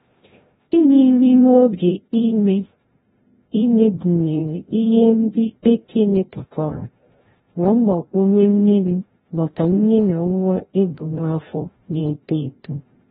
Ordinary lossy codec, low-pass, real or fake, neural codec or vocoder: AAC, 16 kbps; 7.2 kHz; fake; codec, 16 kHz, 0.5 kbps, FreqCodec, larger model